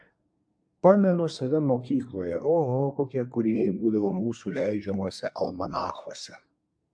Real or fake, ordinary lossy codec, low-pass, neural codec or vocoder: fake; AAC, 64 kbps; 9.9 kHz; codec, 24 kHz, 1 kbps, SNAC